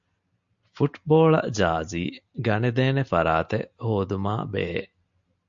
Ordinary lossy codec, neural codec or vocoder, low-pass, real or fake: MP3, 48 kbps; none; 7.2 kHz; real